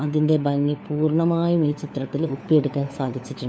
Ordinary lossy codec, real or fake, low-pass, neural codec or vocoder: none; fake; none; codec, 16 kHz, 4 kbps, FunCodec, trained on LibriTTS, 50 frames a second